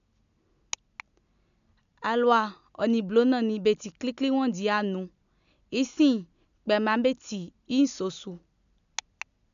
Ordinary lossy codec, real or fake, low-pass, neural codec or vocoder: none; real; 7.2 kHz; none